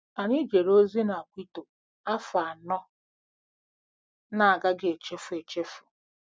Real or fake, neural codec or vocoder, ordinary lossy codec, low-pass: real; none; none; none